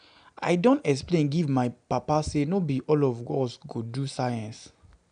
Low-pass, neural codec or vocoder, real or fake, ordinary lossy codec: 9.9 kHz; none; real; none